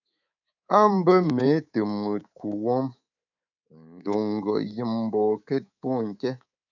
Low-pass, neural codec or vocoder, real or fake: 7.2 kHz; codec, 24 kHz, 3.1 kbps, DualCodec; fake